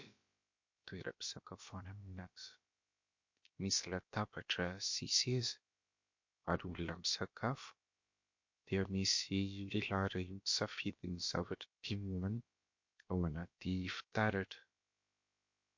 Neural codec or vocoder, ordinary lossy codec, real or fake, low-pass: codec, 16 kHz, about 1 kbps, DyCAST, with the encoder's durations; MP3, 48 kbps; fake; 7.2 kHz